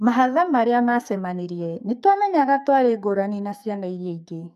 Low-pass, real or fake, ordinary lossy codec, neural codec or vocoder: 14.4 kHz; fake; none; codec, 44.1 kHz, 2.6 kbps, SNAC